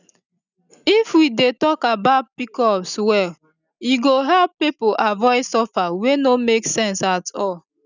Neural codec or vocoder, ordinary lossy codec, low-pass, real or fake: none; none; 7.2 kHz; real